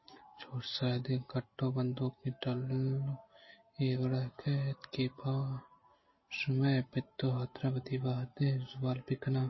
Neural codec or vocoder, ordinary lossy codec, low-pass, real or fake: none; MP3, 24 kbps; 7.2 kHz; real